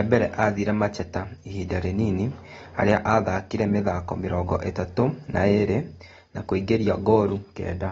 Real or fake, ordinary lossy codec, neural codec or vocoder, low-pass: real; AAC, 24 kbps; none; 19.8 kHz